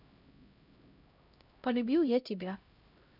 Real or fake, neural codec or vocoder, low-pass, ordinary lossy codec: fake; codec, 16 kHz, 0.5 kbps, X-Codec, HuBERT features, trained on LibriSpeech; 5.4 kHz; none